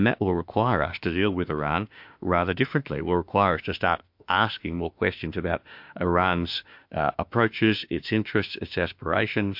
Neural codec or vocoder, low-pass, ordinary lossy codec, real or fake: autoencoder, 48 kHz, 32 numbers a frame, DAC-VAE, trained on Japanese speech; 5.4 kHz; MP3, 48 kbps; fake